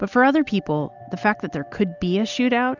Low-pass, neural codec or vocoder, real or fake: 7.2 kHz; none; real